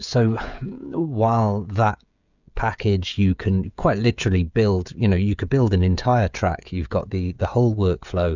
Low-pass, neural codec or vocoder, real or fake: 7.2 kHz; codec, 16 kHz, 16 kbps, FreqCodec, smaller model; fake